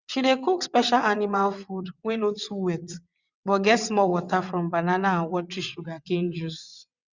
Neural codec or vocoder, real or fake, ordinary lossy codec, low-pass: vocoder, 22.05 kHz, 80 mel bands, WaveNeXt; fake; Opus, 64 kbps; 7.2 kHz